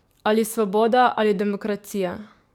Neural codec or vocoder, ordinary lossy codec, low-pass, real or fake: codec, 44.1 kHz, 7.8 kbps, DAC; none; 19.8 kHz; fake